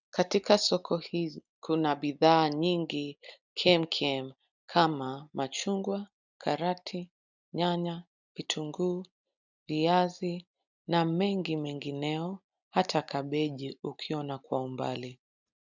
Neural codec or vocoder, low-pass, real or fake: none; 7.2 kHz; real